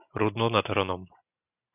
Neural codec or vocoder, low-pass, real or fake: none; 3.6 kHz; real